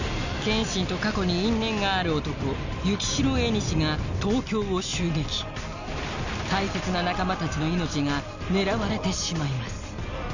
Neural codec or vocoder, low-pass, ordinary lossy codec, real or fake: none; 7.2 kHz; none; real